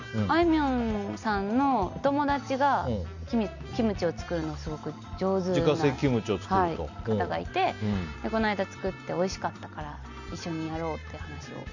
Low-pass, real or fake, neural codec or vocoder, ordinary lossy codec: 7.2 kHz; real; none; none